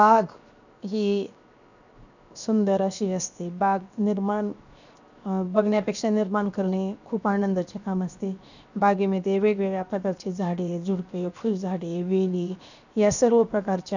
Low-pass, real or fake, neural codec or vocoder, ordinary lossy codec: 7.2 kHz; fake; codec, 16 kHz, 0.7 kbps, FocalCodec; none